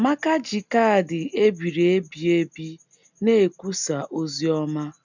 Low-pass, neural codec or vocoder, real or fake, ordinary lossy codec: 7.2 kHz; none; real; none